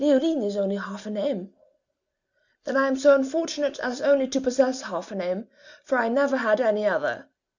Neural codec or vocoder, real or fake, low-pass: none; real; 7.2 kHz